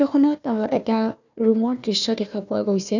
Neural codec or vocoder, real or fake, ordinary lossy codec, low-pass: codec, 16 kHz, 1 kbps, FunCodec, trained on Chinese and English, 50 frames a second; fake; none; 7.2 kHz